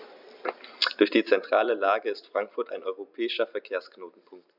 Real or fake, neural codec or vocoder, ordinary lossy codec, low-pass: fake; vocoder, 44.1 kHz, 128 mel bands every 256 samples, BigVGAN v2; none; 5.4 kHz